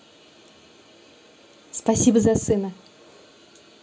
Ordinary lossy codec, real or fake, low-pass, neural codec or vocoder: none; real; none; none